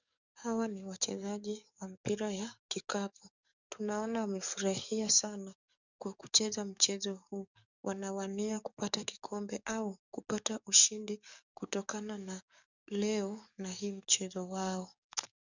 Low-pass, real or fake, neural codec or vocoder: 7.2 kHz; fake; codec, 44.1 kHz, 7.8 kbps, DAC